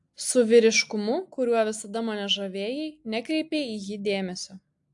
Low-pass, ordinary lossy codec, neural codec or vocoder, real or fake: 10.8 kHz; AAC, 64 kbps; none; real